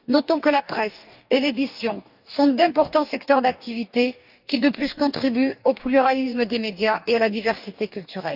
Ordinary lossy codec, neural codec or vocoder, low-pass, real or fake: none; codec, 44.1 kHz, 2.6 kbps, DAC; 5.4 kHz; fake